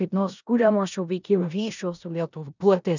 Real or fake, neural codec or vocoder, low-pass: fake; codec, 16 kHz in and 24 kHz out, 0.9 kbps, LongCat-Audio-Codec, four codebook decoder; 7.2 kHz